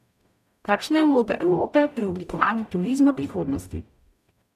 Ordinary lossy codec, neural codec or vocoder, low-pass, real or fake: none; codec, 44.1 kHz, 0.9 kbps, DAC; 14.4 kHz; fake